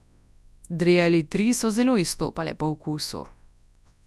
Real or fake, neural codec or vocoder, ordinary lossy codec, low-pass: fake; codec, 24 kHz, 0.9 kbps, WavTokenizer, large speech release; none; none